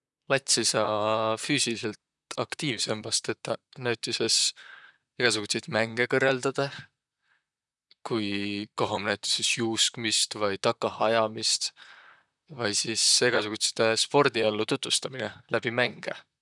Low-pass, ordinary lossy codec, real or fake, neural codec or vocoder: 10.8 kHz; none; fake; vocoder, 44.1 kHz, 128 mel bands, Pupu-Vocoder